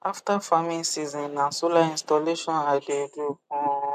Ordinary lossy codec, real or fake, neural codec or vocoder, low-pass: MP3, 96 kbps; real; none; 14.4 kHz